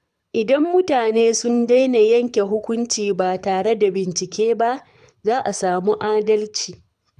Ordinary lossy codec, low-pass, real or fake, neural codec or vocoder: none; none; fake; codec, 24 kHz, 6 kbps, HILCodec